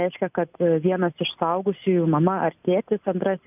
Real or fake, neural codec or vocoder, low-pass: real; none; 3.6 kHz